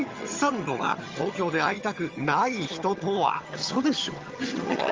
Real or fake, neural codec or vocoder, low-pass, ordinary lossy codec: fake; vocoder, 22.05 kHz, 80 mel bands, HiFi-GAN; 7.2 kHz; Opus, 32 kbps